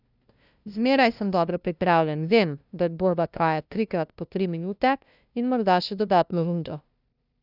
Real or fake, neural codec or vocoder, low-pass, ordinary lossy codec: fake; codec, 16 kHz, 0.5 kbps, FunCodec, trained on LibriTTS, 25 frames a second; 5.4 kHz; none